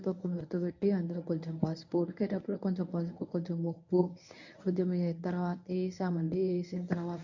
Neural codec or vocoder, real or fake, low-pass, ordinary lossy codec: codec, 24 kHz, 0.9 kbps, WavTokenizer, medium speech release version 1; fake; 7.2 kHz; none